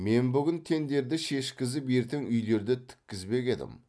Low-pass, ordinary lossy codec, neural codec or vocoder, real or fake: none; none; none; real